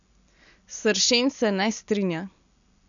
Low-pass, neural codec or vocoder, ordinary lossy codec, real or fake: 7.2 kHz; none; none; real